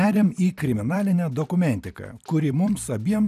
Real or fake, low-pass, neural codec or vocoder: fake; 14.4 kHz; vocoder, 44.1 kHz, 128 mel bands every 256 samples, BigVGAN v2